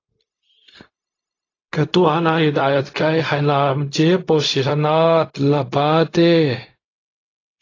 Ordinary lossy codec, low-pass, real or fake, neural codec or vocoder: AAC, 32 kbps; 7.2 kHz; fake; codec, 16 kHz, 0.4 kbps, LongCat-Audio-Codec